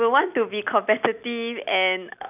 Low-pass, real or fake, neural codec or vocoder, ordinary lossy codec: 3.6 kHz; real; none; none